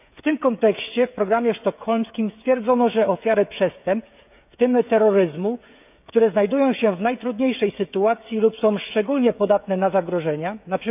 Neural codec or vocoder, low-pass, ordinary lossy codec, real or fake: codec, 16 kHz, 16 kbps, FreqCodec, smaller model; 3.6 kHz; none; fake